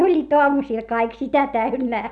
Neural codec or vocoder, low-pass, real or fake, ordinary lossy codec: none; none; real; none